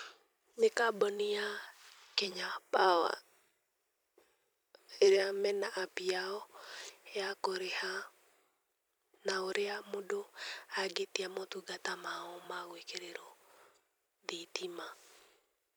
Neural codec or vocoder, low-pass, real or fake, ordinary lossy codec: vocoder, 44.1 kHz, 128 mel bands every 256 samples, BigVGAN v2; none; fake; none